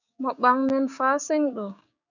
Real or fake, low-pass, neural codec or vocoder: fake; 7.2 kHz; codec, 16 kHz, 6 kbps, DAC